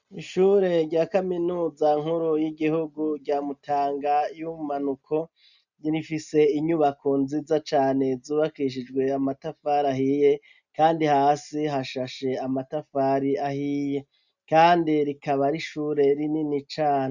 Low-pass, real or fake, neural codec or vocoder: 7.2 kHz; real; none